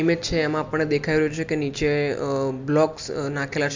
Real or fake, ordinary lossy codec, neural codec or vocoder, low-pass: real; MP3, 64 kbps; none; 7.2 kHz